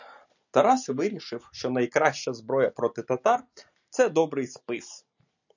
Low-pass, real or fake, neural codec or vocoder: 7.2 kHz; real; none